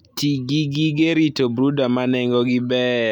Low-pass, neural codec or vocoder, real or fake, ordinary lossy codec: 19.8 kHz; none; real; none